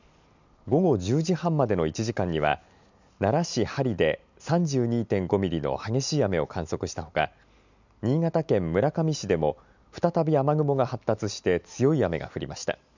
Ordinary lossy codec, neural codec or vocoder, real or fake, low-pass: none; none; real; 7.2 kHz